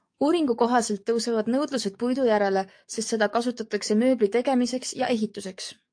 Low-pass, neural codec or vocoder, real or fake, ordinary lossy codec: 9.9 kHz; codec, 44.1 kHz, 7.8 kbps, DAC; fake; AAC, 64 kbps